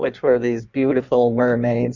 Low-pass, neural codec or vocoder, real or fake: 7.2 kHz; codec, 16 kHz in and 24 kHz out, 1.1 kbps, FireRedTTS-2 codec; fake